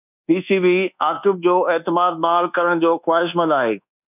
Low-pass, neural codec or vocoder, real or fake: 3.6 kHz; codec, 24 kHz, 1.2 kbps, DualCodec; fake